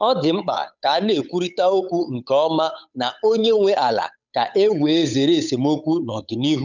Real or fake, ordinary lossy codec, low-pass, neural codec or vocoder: fake; none; 7.2 kHz; codec, 16 kHz, 8 kbps, FunCodec, trained on Chinese and English, 25 frames a second